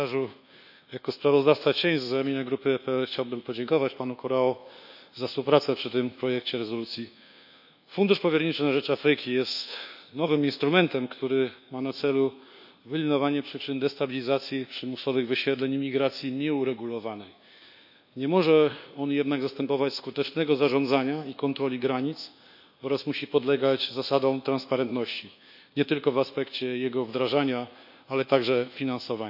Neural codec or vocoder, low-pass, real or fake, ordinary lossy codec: codec, 24 kHz, 1.2 kbps, DualCodec; 5.4 kHz; fake; none